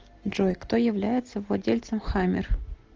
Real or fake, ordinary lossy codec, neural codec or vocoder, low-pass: real; Opus, 16 kbps; none; 7.2 kHz